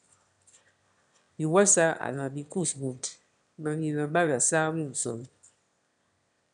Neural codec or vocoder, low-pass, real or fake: autoencoder, 22.05 kHz, a latent of 192 numbers a frame, VITS, trained on one speaker; 9.9 kHz; fake